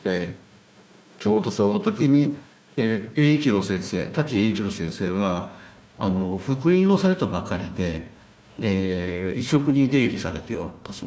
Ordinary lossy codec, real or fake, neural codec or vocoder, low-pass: none; fake; codec, 16 kHz, 1 kbps, FunCodec, trained on Chinese and English, 50 frames a second; none